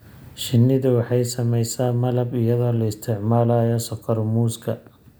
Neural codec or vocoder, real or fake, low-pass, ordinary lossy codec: none; real; none; none